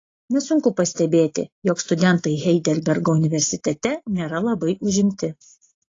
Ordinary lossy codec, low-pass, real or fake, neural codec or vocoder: AAC, 32 kbps; 7.2 kHz; real; none